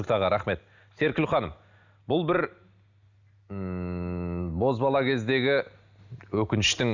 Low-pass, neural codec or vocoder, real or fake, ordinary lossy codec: 7.2 kHz; none; real; none